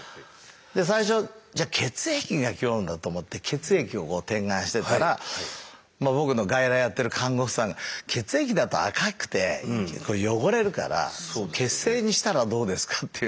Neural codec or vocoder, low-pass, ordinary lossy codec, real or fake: none; none; none; real